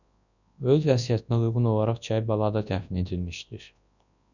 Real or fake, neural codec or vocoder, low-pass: fake; codec, 24 kHz, 0.9 kbps, WavTokenizer, large speech release; 7.2 kHz